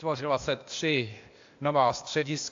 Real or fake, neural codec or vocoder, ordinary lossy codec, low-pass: fake; codec, 16 kHz, 0.8 kbps, ZipCodec; AAC, 48 kbps; 7.2 kHz